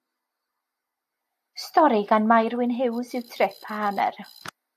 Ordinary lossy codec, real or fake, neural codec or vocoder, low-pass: AAC, 64 kbps; real; none; 14.4 kHz